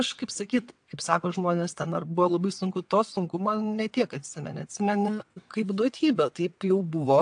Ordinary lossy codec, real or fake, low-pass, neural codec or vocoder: AAC, 64 kbps; fake; 9.9 kHz; vocoder, 22.05 kHz, 80 mel bands, WaveNeXt